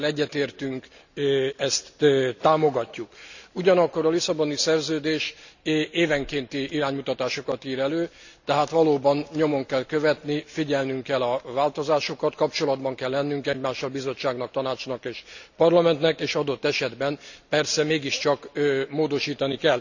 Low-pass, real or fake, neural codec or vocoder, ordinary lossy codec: 7.2 kHz; real; none; none